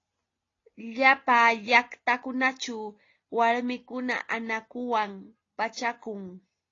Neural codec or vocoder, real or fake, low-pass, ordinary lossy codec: none; real; 7.2 kHz; AAC, 32 kbps